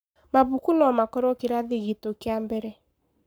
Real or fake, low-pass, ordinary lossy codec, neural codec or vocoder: fake; none; none; codec, 44.1 kHz, 7.8 kbps, Pupu-Codec